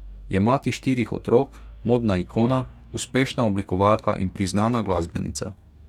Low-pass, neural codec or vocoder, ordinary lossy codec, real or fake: 19.8 kHz; codec, 44.1 kHz, 2.6 kbps, DAC; none; fake